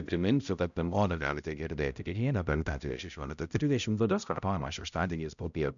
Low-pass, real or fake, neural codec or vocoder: 7.2 kHz; fake; codec, 16 kHz, 0.5 kbps, X-Codec, HuBERT features, trained on balanced general audio